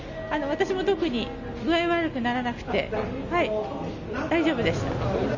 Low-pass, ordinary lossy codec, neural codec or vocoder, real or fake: 7.2 kHz; none; none; real